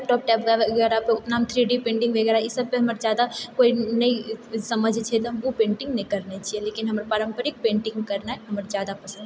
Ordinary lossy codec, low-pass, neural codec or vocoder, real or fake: none; none; none; real